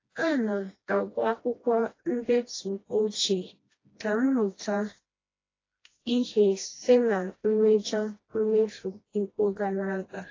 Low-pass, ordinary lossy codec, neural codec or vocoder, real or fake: 7.2 kHz; AAC, 32 kbps; codec, 16 kHz, 1 kbps, FreqCodec, smaller model; fake